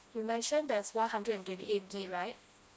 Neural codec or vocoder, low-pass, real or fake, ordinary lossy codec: codec, 16 kHz, 1 kbps, FreqCodec, smaller model; none; fake; none